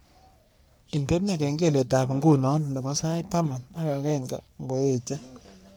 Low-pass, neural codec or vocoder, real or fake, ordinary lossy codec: none; codec, 44.1 kHz, 3.4 kbps, Pupu-Codec; fake; none